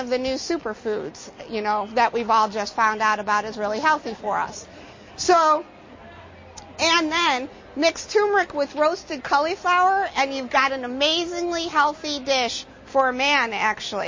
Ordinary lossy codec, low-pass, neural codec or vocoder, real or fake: MP3, 32 kbps; 7.2 kHz; none; real